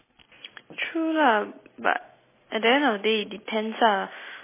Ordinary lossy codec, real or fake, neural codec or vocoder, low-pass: MP3, 16 kbps; real; none; 3.6 kHz